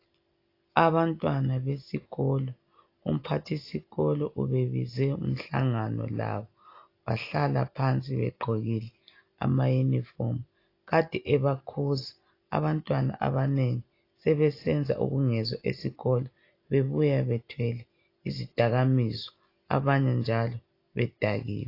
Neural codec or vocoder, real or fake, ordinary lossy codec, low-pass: none; real; AAC, 32 kbps; 5.4 kHz